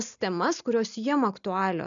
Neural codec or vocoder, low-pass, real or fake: none; 7.2 kHz; real